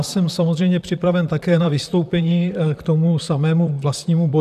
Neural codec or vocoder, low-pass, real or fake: vocoder, 44.1 kHz, 128 mel bands, Pupu-Vocoder; 14.4 kHz; fake